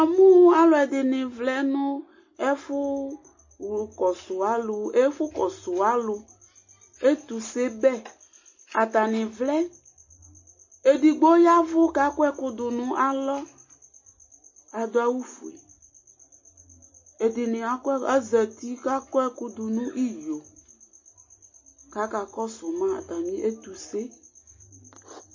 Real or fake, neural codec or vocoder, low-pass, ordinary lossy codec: real; none; 7.2 kHz; MP3, 32 kbps